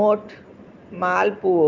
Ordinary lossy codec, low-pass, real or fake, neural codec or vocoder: Opus, 32 kbps; 7.2 kHz; real; none